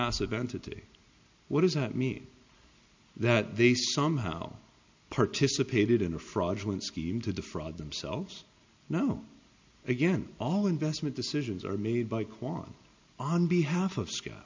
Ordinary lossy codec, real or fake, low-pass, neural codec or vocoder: MP3, 64 kbps; real; 7.2 kHz; none